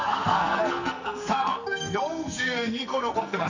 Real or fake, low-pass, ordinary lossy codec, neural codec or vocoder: fake; 7.2 kHz; none; codec, 44.1 kHz, 2.6 kbps, SNAC